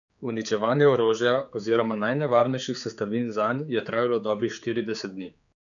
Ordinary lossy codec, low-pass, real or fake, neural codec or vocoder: none; 7.2 kHz; fake; codec, 16 kHz, 4 kbps, X-Codec, HuBERT features, trained on general audio